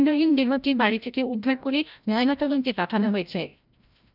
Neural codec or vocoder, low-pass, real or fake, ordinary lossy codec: codec, 16 kHz, 0.5 kbps, FreqCodec, larger model; 5.4 kHz; fake; none